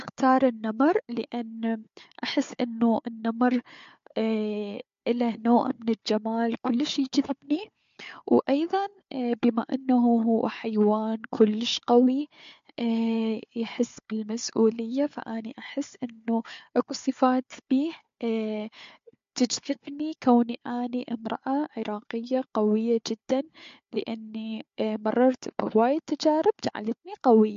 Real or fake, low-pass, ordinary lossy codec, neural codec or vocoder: fake; 7.2 kHz; MP3, 48 kbps; codec, 16 kHz, 4 kbps, FunCodec, trained on Chinese and English, 50 frames a second